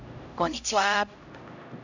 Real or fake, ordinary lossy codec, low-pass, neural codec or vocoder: fake; none; 7.2 kHz; codec, 16 kHz, 0.5 kbps, X-Codec, HuBERT features, trained on LibriSpeech